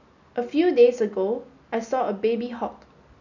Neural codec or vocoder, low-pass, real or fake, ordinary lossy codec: none; 7.2 kHz; real; none